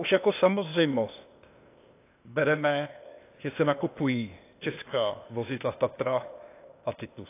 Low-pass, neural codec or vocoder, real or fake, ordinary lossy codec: 3.6 kHz; codec, 16 kHz, 0.8 kbps, ZipCodec; fake; AAC, 24 kbps